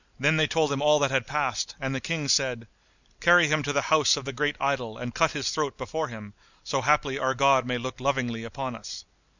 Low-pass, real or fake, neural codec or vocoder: 7.2 kHz; real; none